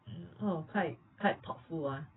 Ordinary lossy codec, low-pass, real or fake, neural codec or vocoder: AAC, 16 kbps; 7.2 kHz; real; none